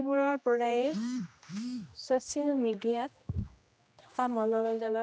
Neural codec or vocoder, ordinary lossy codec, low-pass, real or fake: codec, 16 kHz, 1 kbps, X-Codec, HuBERT features, trained on general audio; none; none; fake